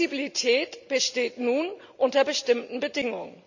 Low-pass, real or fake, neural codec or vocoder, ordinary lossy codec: 7.2 kHz; real; none; none